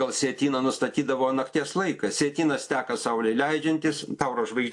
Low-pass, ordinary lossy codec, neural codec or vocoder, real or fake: 10.8 kHz; AAC, 64 kbps; none; real